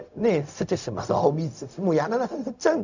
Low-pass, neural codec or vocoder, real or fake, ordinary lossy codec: 7.2 kHz; codec, 16 kHz, 0.4 kbps, LongCat-Audio-Codec; fake; none